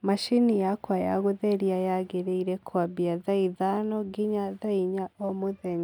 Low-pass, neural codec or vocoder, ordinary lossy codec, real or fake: 19.8 kHz; none; none; real